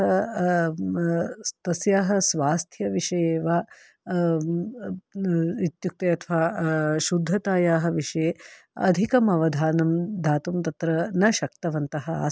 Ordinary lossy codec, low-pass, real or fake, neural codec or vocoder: none; none; real; none